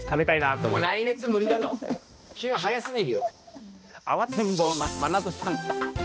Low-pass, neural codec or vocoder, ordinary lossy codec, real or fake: none; codec, 16 kHz, 1 kbps, X-Codec, HuBERT features, trained on balanced general audio; none; fake